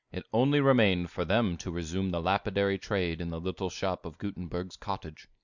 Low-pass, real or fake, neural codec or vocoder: 7.2 kHz; real; none